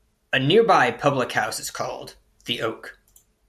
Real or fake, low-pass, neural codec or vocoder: real; 14.4 kHz; none